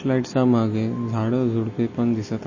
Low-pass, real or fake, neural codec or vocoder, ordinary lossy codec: 7.2 kHz; real; none; MP3, 32 kbps